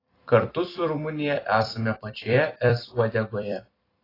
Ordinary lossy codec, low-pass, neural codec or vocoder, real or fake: AAC, 24 kbps; 5.4 kHz; vocoder, 44.1 kHz, 128 mel bands every 256 samples, BigVGAN v2; fake